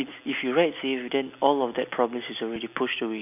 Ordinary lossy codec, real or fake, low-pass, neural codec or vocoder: none; real; 3.6 kHz; none